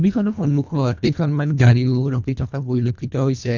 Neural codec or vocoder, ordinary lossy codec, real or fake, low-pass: codec, 24 kHz, 1.5 kbps, HILCodec; none; fake; 7.2 kHz